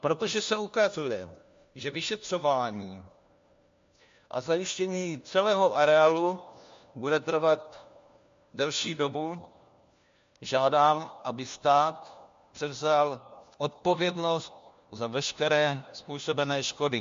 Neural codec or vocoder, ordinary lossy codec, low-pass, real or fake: codec, 16 kHz, 1 kbps, FunCodec, trained on LibriTTS, 50 frames a second; MP3, 48 kbps; 7.2 kHz; fake